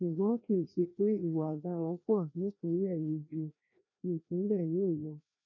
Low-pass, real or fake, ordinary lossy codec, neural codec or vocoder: 7.2 kHz; fake; none; codec, 16 kHz, 1 kbps, FreqCodec, larger model